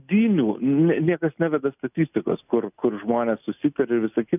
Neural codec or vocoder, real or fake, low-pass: none; real; 3.6 kHz